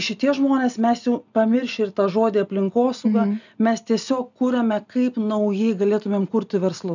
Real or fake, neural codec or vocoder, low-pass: real; none; 7.2 kHz